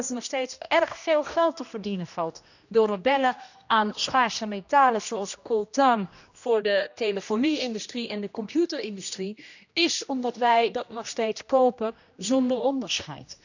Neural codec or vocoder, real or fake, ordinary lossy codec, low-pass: codec, 16 kHz, 1 kbps, X-Codec, HuBERT features, trained on general audio; fake; none; 7.2 kHz